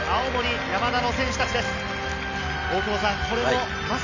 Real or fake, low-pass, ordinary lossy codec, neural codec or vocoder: real; 7.2 kHz; AAC, 48 kbps; none